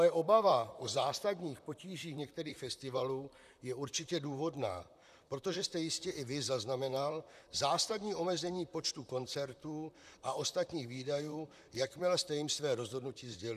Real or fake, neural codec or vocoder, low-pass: fake; vocoder, 44.1 kHz, 128 mel bands, Pupu-Vocoder; 14.4 kHz